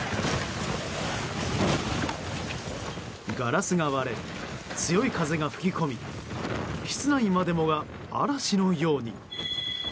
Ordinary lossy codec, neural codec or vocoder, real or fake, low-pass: none; none; real; none